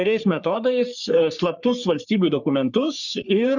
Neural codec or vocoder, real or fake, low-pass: codec, 44.1 kHz, 3.4 kbps, Pupu-Codec; fake; 7.2 kHz